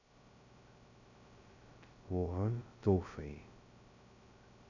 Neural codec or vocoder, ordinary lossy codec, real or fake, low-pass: codec, 16 kHz, 0.2 kbps, FocalCodec; none; fake; 7.2 kHz